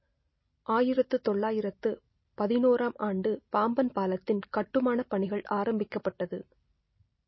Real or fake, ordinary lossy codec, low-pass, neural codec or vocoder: real; MP3, 24 kbps; 7.2 kHz; none